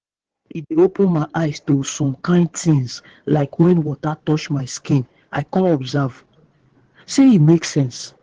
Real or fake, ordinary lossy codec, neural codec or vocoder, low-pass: fake; Opus, 16 kbps; codec, 16 kHz in and 24 kHz out, 2.2 kbps, FireRedTTS-2 codec; 9.9 kHz